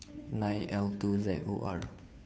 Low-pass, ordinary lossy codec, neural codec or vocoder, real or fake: none; none; codec, 16 kHz, 2 kbps, FunCodec, trained on Chinese and English, 25 frames a second; fake